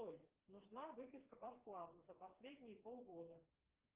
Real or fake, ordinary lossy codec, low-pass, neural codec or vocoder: fake; Opus, 16 kbps; 3.6 kHz; codec, 16 kHz, 2 kbps, FreqCodec, smaller model